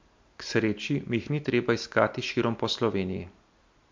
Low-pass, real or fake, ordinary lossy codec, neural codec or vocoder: 7.2 kHz; real; MP3, 48 kbps; none